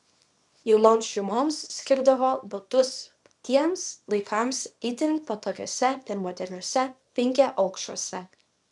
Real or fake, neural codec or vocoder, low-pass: fake; codec, 24 kHz, 0.9 kbps, WavTokenizer, small release; 10.8 kHz